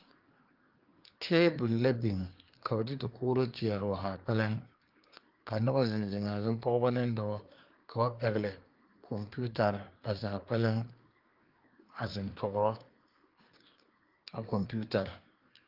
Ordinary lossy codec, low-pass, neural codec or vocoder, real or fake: Opus, 32 kbps; 5.4 kHz; codec, 24 kHz, 1 kbps, SNAC; fake